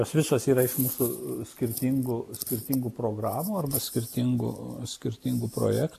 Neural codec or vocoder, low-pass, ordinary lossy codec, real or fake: none; 14.4 kHz; AAC, 96 kbps; real